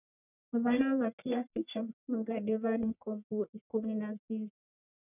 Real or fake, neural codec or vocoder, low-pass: fake; codec, 44.1 kHz, 1.7 kbps, Pupu-Codec; 3.6 kHz